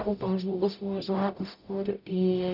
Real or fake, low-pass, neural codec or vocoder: fake; 5.4 kHz; codec, 44.1 kHz, 0.9 kbps, DAC